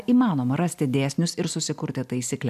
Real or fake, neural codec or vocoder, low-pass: real; none; 14.4 kHz